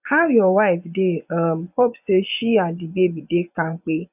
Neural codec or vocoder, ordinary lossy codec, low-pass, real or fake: vocoder, 24 kHz, 100 mel bands, Vocos; none; 3.6 kHz; fake